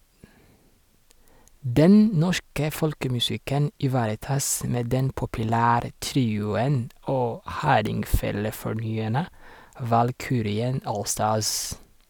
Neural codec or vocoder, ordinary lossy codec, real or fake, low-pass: none; none; real; none